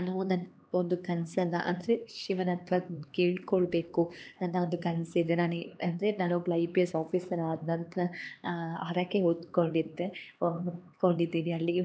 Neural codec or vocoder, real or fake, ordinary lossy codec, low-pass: codec, 16 kHz, 2 kbps, X-Codec, HuBERT features, trained on LibriSpeech; fake; none; none